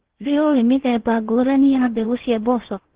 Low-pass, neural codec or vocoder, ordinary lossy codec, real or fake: 3.6 kHz; codec, 16 kHz in and 24 kHz out, 0.8 kbps, FocalCodec, streaming, 65536 codes; Opus, 16 kbps; fake